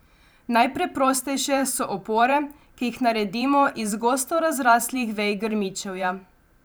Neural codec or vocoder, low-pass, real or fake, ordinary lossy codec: vocoder, 44.1 kHz, 128 mel bands every 512 samples, BigVGAN v2; none; fake; none